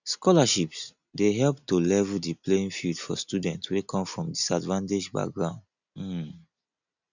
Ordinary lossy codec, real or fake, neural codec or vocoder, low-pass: none; real; none; 7.2 kHz